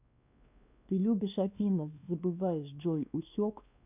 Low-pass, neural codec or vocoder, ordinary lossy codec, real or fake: 3.6 kHz; codec, 16 kHz, 2 kbps, X-Codec, WavLM features, trained on Multilingual LibriSpeech; none; fake